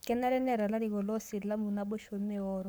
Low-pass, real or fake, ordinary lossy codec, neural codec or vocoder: none; fake; none; codec, 44.1 kHz, 7.8 kbps, Pupu-Codec